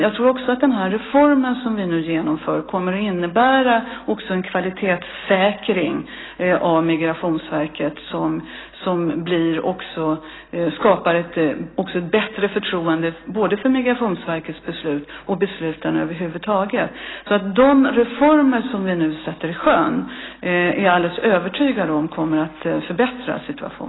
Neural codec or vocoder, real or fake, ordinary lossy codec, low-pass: none; real; AAC, 16 kbps; 7.2 kHz